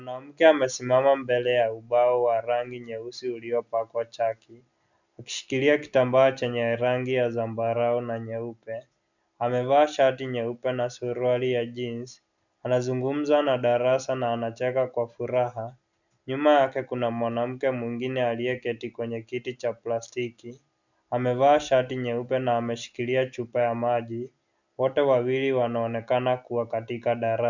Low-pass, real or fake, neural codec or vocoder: 7.2 kHz; real; none